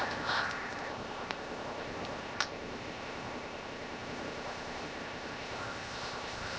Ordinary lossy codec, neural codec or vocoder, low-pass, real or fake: none; codec, 16 kHz, 0.7 kbps, FocalCodec; none; fake